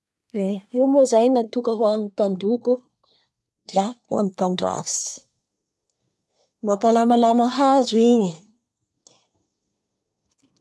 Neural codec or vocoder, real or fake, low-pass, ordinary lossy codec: codec, 24 kHz, 1 kbps, SNAC; fake; none; none